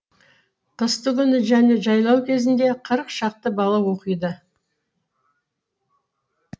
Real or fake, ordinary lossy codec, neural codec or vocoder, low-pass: real; none; none; none